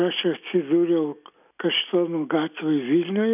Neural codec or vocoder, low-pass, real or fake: none; 3.6 kHz; real